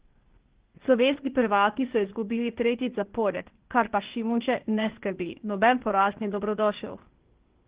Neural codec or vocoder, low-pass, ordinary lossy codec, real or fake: codec, 16 kHz, 0.8 kbps, ZipCodec; 3.6 kHz; Opus, 16 kbps; fake